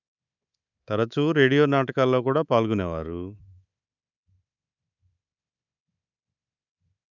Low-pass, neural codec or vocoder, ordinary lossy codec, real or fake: 7.2 kHz; codec, 24 kHz, 3.1 kbps, DualCodec; none; fake